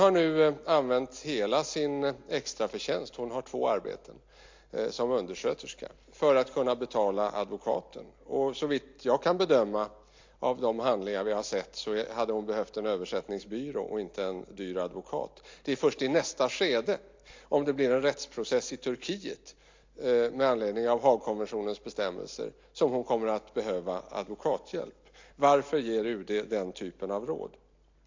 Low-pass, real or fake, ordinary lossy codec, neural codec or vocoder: 7.2 kHz; real; MP3, 48 kbps; none